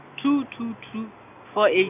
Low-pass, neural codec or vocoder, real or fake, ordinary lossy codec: 3.6 kHz; none; real; none